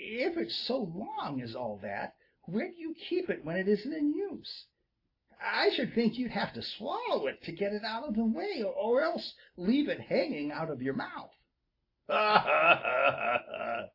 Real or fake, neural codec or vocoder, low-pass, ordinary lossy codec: real; none; 5.4 kHz; AAC, 32 kbps